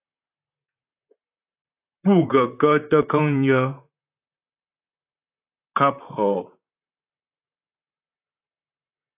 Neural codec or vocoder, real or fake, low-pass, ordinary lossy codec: vocoder, 44.1 kHz, 128 mel bands, Pupu-Vocoder; fake; 3.6 kHz; AAC, 32 kbps